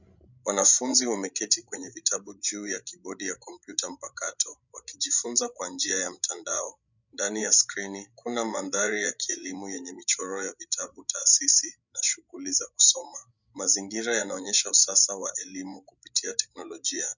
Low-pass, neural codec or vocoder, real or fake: 7.2 kHz; codec, 16 kHz, 16 kbps, FreqCodec, larger model; fake